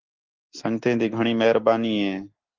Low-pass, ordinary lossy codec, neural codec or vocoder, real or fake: 7.2 kHz; Opus, 16 kbps; none; real